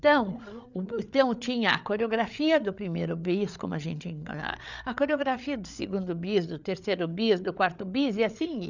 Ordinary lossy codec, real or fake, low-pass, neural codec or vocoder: none; fake; 7.2 kHz; codec, 16 kHz, 8 kbps, FreqCodec, larger model